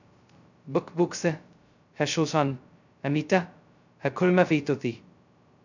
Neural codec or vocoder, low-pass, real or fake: codec, 16 kHz, 0.2 kbps, FocalCodec; 7.2 kHz; fake